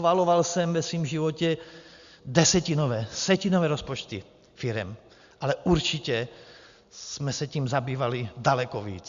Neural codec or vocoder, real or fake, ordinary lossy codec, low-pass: none; real; Opus, 64 kbps; 7.2 kHz